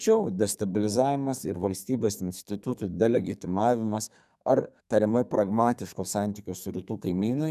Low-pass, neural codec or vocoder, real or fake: 14.4 kHz; codec, 32 kHz, 1.9 kbps, SNAC; fake